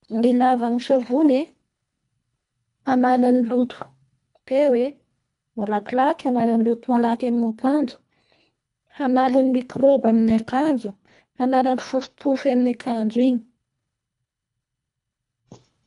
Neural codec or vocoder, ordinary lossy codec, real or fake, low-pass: codec, 24 kHz, 1.5 kbps, HILCodec; MP3, 96 kbps; fake; 10.8 kHz